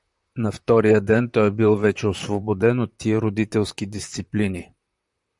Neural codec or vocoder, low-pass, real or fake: vocoder, 44.1 kHz, 128 mel bands, Pupu-Vocoder; 10.8 kHz; fake